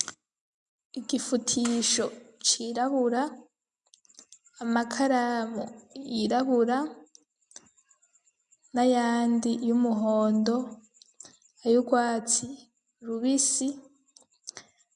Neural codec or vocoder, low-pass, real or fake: none; 10.8 kHz; real